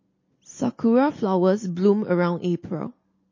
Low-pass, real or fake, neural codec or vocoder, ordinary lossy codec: 7.2 kHz; real; none; MP3, 32 kbps